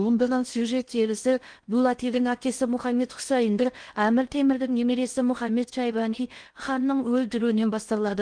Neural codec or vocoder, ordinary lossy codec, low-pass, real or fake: codec, 16 kHz in and 24 kHz out, 0.6 kbps, FocalCodec, streaming, 2048 codes; Opus, 24 kbps; 9.9 kHz; fake